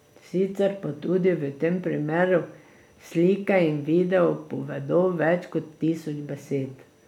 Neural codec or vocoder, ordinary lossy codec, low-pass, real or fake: none; none; 19.8 kHz; real